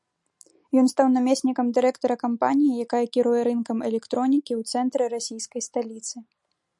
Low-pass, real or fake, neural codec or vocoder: 10.8 kHz; real; none